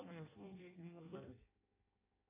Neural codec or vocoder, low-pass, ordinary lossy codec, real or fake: codec, 16 kHz in and 24 kHz out, 0.6 kbps, FireRedTTS-2 codec; 3.6 kHz; AAC, 24 kbps; fake